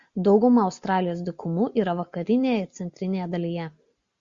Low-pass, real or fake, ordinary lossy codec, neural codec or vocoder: 7.2 kHz; real; Opus, 64 kbps; none